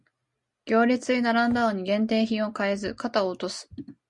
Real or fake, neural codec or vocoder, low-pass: real; none; 10.8 kHz